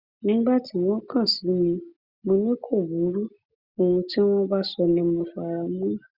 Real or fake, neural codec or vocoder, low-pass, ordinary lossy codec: real; none; 5.4 kHz; Opus, 64 kbps